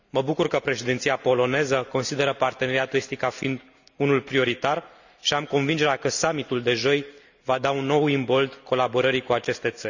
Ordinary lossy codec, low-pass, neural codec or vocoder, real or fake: none; 7.2 kHz; none; real